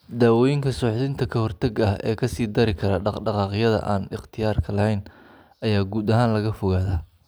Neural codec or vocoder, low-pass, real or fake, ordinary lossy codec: none; none; real; none